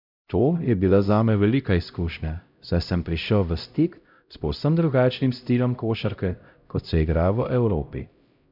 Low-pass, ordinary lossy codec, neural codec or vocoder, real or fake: 5.4 kHz; none; codec, 16 kHz, 0.5 kbps, X-Codec, HuBERT features, trained on LibriSpeech; fake